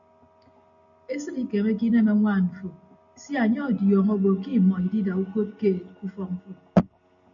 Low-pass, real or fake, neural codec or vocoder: 7.2 kHz; real; none